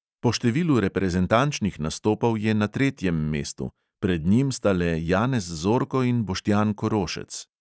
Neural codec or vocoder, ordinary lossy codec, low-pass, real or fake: none; none; none; real